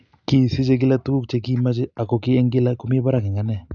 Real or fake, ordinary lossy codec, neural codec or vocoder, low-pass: real; none; none; 7.2 kHz